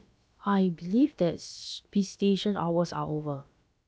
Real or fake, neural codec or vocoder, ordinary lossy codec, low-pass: fake; codec, 16 kHz, about 1 kbps, DyCAST, with the encoder's durations; none; none